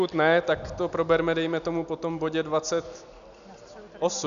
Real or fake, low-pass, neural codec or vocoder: real; 7.2 kHz; none